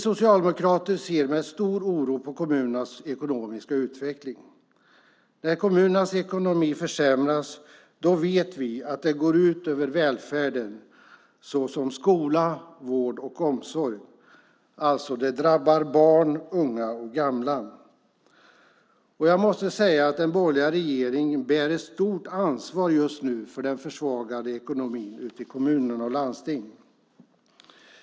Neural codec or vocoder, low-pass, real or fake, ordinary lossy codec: none; none; real; none